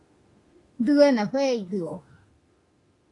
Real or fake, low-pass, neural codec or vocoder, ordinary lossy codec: fake; 10.8 kHz; autoencoder, 48 kHz, 32 numbers a frame, DAC-VAE, trained on Japanese speech; AAC, 32 kbps